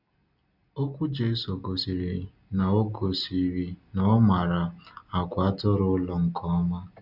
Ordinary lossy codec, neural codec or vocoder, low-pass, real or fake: none; none; 5.4 kHz; real